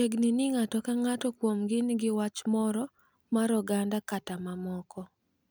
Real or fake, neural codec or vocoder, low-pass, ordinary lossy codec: real; none; none; none